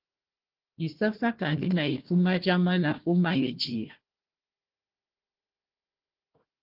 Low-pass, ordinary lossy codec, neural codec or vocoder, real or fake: 5.4 kHz; Opus, 16 kbps; codec, 16 kHz, 1 kbps, FunCodec, trained on Chinese and English, 50 frames a second; fake